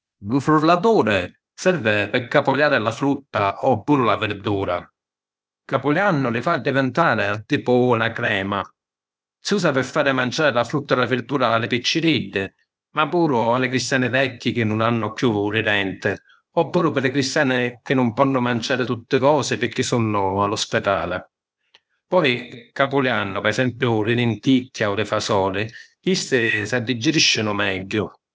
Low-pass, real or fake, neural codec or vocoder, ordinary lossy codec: none; fake; codec, 16 kHz, 0.8 kbps, ZipCodec; none